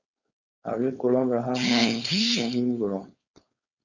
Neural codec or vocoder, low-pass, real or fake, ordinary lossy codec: codec, 16 kHz, 4.8 kbps, FACodec; 7.2 kHz; fake; Opus, 64 kbps